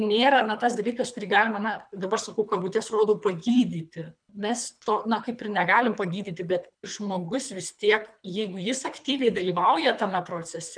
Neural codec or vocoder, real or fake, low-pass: codec, 24 kHz, 3 kbps, HILCodec; fake; 9.9 kHz